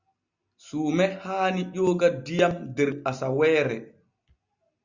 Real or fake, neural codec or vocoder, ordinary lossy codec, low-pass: real; none; Opus, 64 kbps; 7.2 kHz